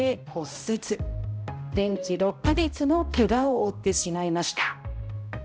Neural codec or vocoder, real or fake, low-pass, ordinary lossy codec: codec, 16 kHz, 0.5 kbps, X-Codec, HuBERT features, trained on balanced general audio; fake; none; none